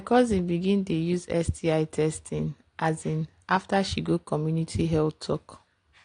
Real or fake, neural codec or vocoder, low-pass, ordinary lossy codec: real; none; 9.9 kHz; AAC, 48 kbps